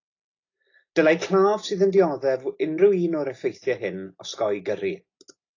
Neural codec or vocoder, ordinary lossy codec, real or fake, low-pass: none; AAC, 32 kbps; real; 7.2 kHz